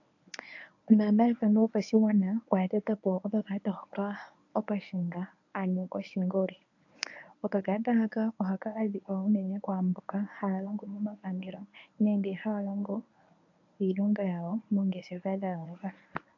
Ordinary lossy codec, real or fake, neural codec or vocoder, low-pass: AAC, 48 kbps; fake; codec, 24 kHz, 0.9 kbps, WavTokenizer, medium speech release version 1; 7.2 kHz